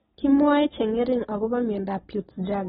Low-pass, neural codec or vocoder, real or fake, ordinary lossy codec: 19.8 kHz; none; real; AAC, 16 kbps